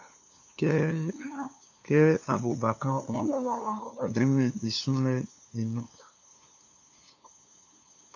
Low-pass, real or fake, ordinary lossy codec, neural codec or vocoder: 7.2 kHz; fake; AAC, 48 kbps; codec, 16 kHz, 2 kbps, FunCodec, trained on LibriTTS, 25 frames a second